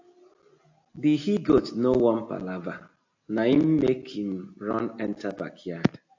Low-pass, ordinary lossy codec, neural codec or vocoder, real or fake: 7.2 kHz; AAC, 48 kbps; none; real